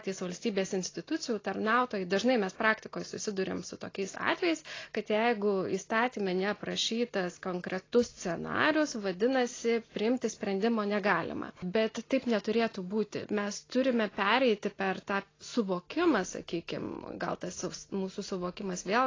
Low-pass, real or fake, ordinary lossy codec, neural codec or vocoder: 7.2 kHz; real; AAC, 32 kbps; none